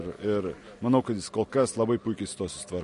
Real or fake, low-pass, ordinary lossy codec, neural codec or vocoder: real; 14.4 kHz; MP3, 48 kbps; none